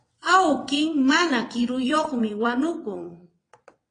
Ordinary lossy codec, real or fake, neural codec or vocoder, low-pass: AAC, 32 kbps; fake; vocoder, 22.05 kHz, 80 mel bands, WaveNeXt; 9.9 kHz